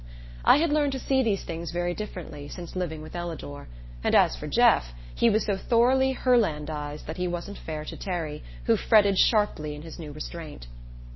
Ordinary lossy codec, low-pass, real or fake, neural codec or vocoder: MP3, 24 kbps; 7.2 kHz; real; none